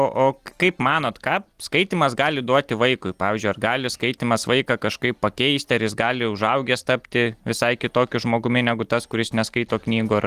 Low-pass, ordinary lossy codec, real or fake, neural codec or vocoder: 14.4 kHz; Opus, 24 kbps; real; none